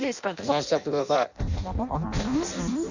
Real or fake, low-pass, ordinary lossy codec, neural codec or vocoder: fake; 7.2 kHz; none; codec, 16 kHz in and 24 kHz out, 0.6 kbps, FireRedTTS-2 codec